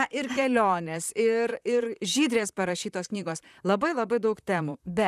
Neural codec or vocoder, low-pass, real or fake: vocoder, 44.1 kHz, 128 mel bands, Pupu-Vocoder; 14.4 kHz; fake